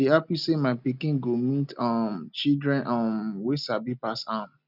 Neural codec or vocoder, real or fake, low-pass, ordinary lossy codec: none; real; 5.4 kHz; none